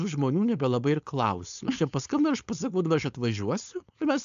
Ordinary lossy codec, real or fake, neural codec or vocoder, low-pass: AAC, 96 kbps; fake; codec, 16 kHz, 4.8 kbps, FACodec; 7.2 kHz